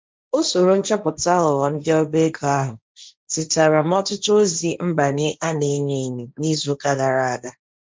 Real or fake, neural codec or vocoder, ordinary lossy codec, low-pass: fake; codec, 16 kHz, 1.1 kbps, Voila-Tokenizer; none; none